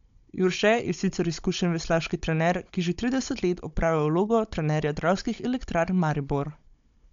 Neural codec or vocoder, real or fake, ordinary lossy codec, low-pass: codec, 16 kHz, 16 kbps, FunCodec, trained on Chinese and English, 50 frames a second; fake; MP3, 64 kbps; 7.2 kHz